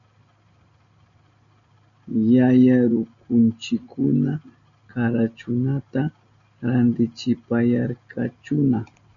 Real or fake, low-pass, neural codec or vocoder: real; 7.2 kHz; none